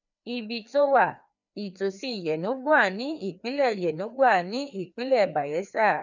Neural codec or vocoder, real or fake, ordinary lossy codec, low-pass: codec, 16 kHz, 2 kbps, FreqCodec, larger model; fake; none; 7.2 kHz